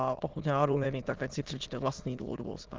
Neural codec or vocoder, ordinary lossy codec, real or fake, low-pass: autoencoder, 22.05 kHz, a latent of 192 numbers a frame, VITS, trained on many speakers; Opus, 16 kbps; fake; 7.2 kHz